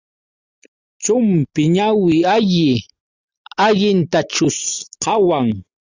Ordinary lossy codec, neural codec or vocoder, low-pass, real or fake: Opus, 64 kbps; none; 7.2 kHz; real